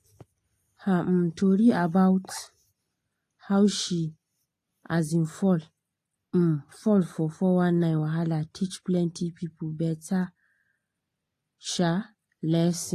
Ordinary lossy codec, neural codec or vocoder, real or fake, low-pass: AAC, 48 kbps; none; real; 14.4 kHz